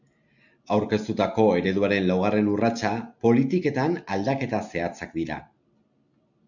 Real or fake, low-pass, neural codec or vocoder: real; 7.2 kHz; none